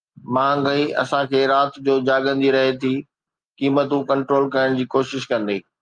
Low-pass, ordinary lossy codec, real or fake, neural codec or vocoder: 9.9 kHz; Opus, 32 kbps; real; none